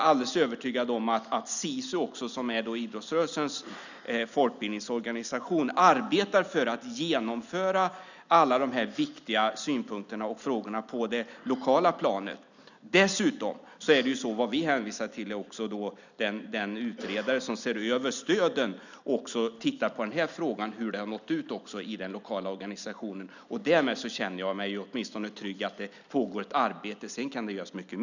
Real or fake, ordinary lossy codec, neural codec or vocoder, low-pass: real; none; none; 7.2 kHz